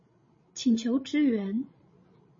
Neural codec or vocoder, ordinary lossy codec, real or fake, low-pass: codec, 16 kHz, 16 kbps, FreqCodec, larger model; MP3, 32 kbps; fake; 7.2 kHz